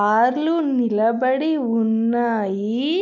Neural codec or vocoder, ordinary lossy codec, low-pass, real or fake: none; none; 7.2 kHz; real